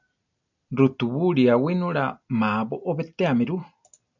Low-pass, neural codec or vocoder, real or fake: 7.2 kHz; none; real